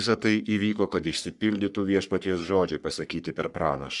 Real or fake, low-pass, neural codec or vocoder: fake; 10.8 kHz; codec, 44.1 kHz, 3.4 kbps, Pupu-Codec